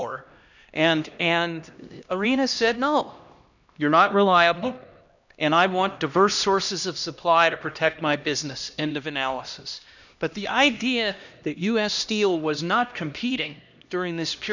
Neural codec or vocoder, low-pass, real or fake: codec, 16 kHz, 1 kbps, X-Codec, HuBERT features, trained on LibriSpeech; 7.2 kHz; fake